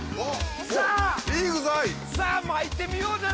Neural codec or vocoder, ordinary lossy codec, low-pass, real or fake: none; none; none; real